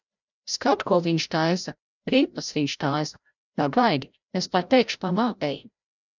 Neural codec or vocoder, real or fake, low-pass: codec, 16 kHz, 0.5 kbps, FreqCodec, larger model; fake; 7.2 kHz